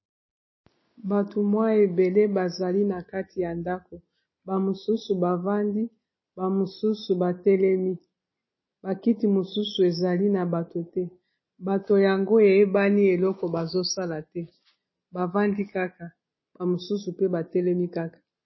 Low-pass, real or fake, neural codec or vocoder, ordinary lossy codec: 7.2 kHz; real; none; MP3, 24 kbps